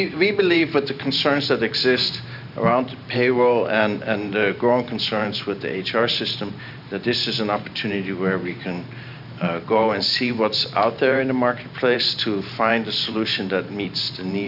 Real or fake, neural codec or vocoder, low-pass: fake; vocoder, 44.1 kHz, 128 mel bands every 512 samples, BigVGAN v2; 5.4 kHz